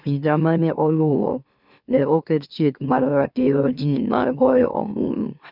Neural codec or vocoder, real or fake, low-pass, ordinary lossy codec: autoencoder, 44.1 kHz, a latent of 192 numbers a frame, MeloTTS; fake; 5.4 kHz; none